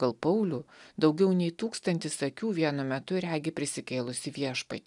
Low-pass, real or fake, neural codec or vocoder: 10.8 kHz; real; none